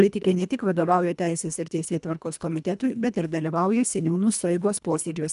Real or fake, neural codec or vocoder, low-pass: fake; codec, 24 kHz, 1.5 kbps, HILCodec; 10.8 kHz